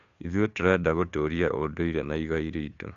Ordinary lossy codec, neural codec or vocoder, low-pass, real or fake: AAC, 64 kbps; codec, 16 kHz, 2 kbps, FunCodec, trained on Chinese and English, 25 frames a second; 7.2 kHz; fake